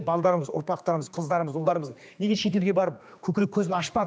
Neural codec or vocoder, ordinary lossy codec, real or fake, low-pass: codec, 16 kHz, 2 kbps, X-Codec, HuBERT features, trained on general audio; none; fake; none